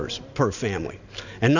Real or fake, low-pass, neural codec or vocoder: real; 7.2 kHz; none